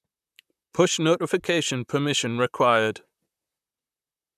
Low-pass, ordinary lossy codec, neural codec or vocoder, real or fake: 14.4 kHz; none; vocoder, 44.1 kHz, 128 mel bands, Pupu-Vocoder; fake